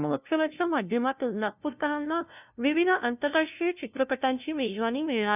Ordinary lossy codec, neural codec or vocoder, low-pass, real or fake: none; codec, 16 kHz, 0.5 kbps, FunCodec, trained on LibriTTS, 25 frames a second; 3.6 kHz; fake